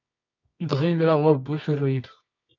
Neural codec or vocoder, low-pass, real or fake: codec, 24 kHz, 0.9 kbps, WavTokenizer, medium music audio release; 7.2 kHz; fake